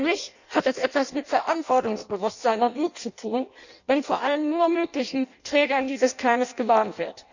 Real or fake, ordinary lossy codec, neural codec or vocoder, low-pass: fake; none; codec, 16 kHz in and 24 kHz out, 0.6 kbps, FireRedTTS-2 codec; 7.2 kHz